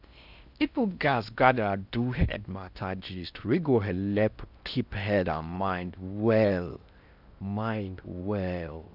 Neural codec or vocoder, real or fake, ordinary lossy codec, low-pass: codec, 16 kHz in and 24 kHz out, 0.6 kbps, FocalCodec, streaming, 4096 codes; fake; none; 5.4 kHz